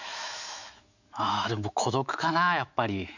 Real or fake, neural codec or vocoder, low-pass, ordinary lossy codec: fake; autoencoder, 48 kHz, 128 numbers a frame, DAC-VAE, trained on Japanese speech; 7.2 kHz; none